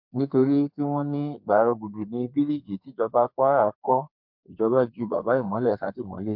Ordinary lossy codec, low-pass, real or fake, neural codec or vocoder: MP3, 48 kbps; 5.4 kHz; fake; codec, 32 kHz, 1.9 kbps, SNAC